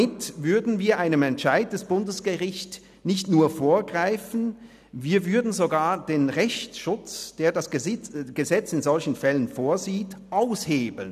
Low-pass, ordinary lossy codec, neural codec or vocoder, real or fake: 14.4 kHz; none; none; real